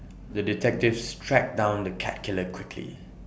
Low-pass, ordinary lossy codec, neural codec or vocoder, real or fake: none; none; none; real